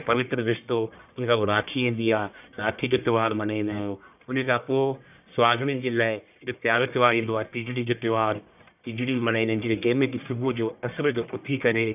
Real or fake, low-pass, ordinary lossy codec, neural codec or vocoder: fake; 3.6 kHz; none; codec, 44.1 kHz, 1.7 kbps, Pupu-Codec